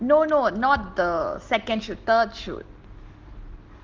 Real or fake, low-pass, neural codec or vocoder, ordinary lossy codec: real; 7.2 kHz; none; Opus, 16 kbps